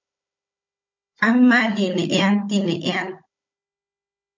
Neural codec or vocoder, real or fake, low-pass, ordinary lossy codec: codec, 16 kHz, 16 kbps, FunCodec, trained on Chinese and English, 50 frames a second; fake; 7.2 kHz; MP3, 48 kbps